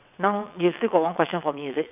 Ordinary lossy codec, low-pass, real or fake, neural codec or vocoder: none; 3.6 kHz; fake; vocoder, 22.05 kHz, 80 mel bands, WaveNeXt